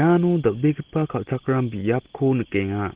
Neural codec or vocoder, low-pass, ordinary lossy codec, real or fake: none; 3.6 kHz; Opus, 16 kbps; real